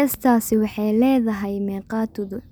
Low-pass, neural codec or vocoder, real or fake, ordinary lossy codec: none; none; real; none